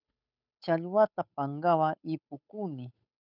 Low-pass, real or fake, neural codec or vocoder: 5.4 kHz; fake; codec, 16 kHz, 8 kbps, FunCodec, trained on Chinese and English, 25 frames a second